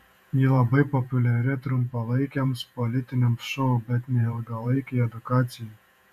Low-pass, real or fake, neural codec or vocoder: 14.4 kHz; fake; vocoder, 48 kHz, 128 mel bands, Vocos